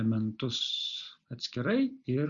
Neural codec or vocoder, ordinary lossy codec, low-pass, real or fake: none; Opus, 64 kbps; 7.2 kHz; real